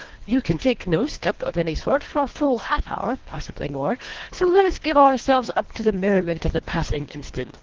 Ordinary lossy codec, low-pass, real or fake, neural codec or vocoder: Opus, 16 kbps; 7.2 kHz; fake; codec, 24 kHz, 1.5 kbps, HILCodec